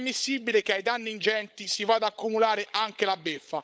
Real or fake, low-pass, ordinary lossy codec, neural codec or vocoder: fake; none; none; codec, 16 kHz, 16 kbps, FunCodec, trained on LibriTTS, 50 frames a second